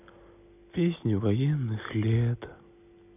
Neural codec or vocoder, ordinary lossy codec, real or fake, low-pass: none; none; real; 3.6 kHz